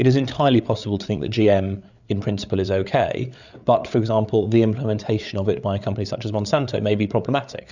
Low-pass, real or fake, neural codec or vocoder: 7.2 kHz; fake; codec, 16 kHz, 8 kbps, FreqCodec, larger model